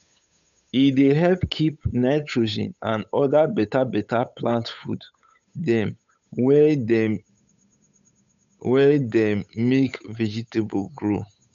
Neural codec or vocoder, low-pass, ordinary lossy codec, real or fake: codec, 16 kHz, 8 kbps, FunCodec, trained on Chinese and English, 25 frames a second; 7.2 kHz; none; fake